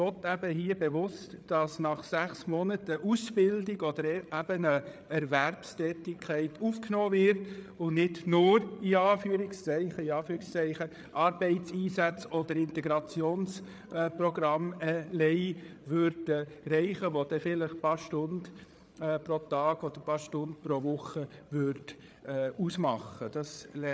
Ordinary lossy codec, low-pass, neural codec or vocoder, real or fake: none; none; codec, 16 kHz, 8 kbps, FreqCodec, larger model; fake